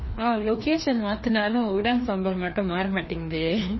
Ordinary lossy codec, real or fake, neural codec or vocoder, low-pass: MP3, 24 kbps; fake; codec, 16 kHz, 2 kbps, FreqCodec, larger model; 7.2 kHz